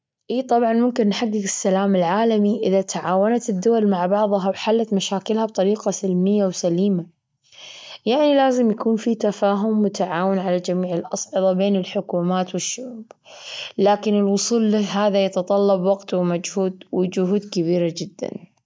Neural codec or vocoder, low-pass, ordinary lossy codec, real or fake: none; none; none; real